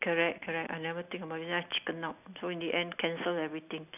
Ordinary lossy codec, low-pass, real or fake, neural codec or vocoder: none; 3.6 kHz; real; none